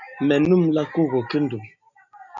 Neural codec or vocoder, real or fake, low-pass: none; real; 7.2 kHz